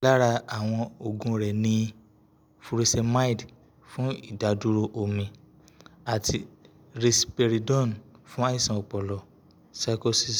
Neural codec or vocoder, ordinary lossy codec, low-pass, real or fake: none; none; none; real